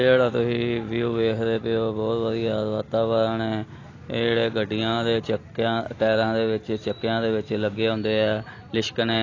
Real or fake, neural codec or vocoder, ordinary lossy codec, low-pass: real; none; AAC, 32 kbps; 7.2 kHz